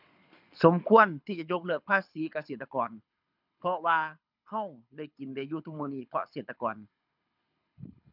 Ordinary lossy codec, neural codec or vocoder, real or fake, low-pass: none; codec, 24 kHz, 6 kbps, HILCodec; fake; 5.4 kHz